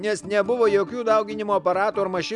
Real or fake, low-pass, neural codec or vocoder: real; 10.8 kHz; none